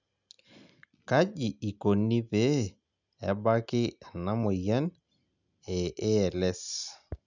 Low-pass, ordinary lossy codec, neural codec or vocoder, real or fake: 7.2 kHz; none; none; real